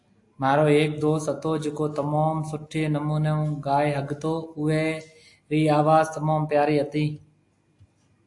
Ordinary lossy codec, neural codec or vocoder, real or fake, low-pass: AAC, 64 kbps; none; real; 10.8 kHz